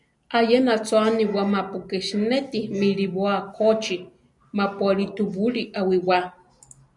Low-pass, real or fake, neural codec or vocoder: 10.8 kHz; real; none